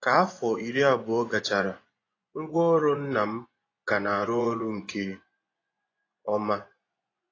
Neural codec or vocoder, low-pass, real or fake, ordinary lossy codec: vocoder, 24 kHz, 100 mel bands, Vocos; 7.2 kHz; fake; AAC, 32 kbps